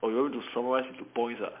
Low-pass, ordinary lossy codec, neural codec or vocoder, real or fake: 3.6 kHz; MP3, 32 kbps; none; real